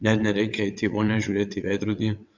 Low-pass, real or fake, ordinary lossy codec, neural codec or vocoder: 7.2 kHz; fake; MP3, 64 kbps; vocoder, 22.05 kHz, 80 mel bands, WaveNeXt